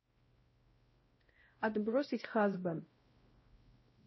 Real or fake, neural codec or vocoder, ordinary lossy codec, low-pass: fake; codec, 16 kHz, 0.5 kbps, X-Codec, WavLM features, trained on Multilingual LibriSpeech; MP3, 24 kbps; 7.2 kHz